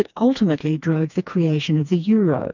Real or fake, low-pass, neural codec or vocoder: fake; 7.2 kHz; codec, 16 kHz, 2 kbps, FreqCodec, smaller model